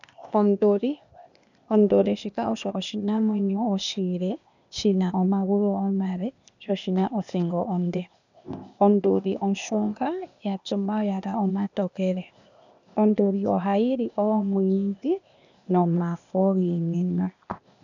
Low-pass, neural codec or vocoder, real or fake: 7.2 kHz; codec, 16 kHz, 0.8 kbps, ZipCodec; fake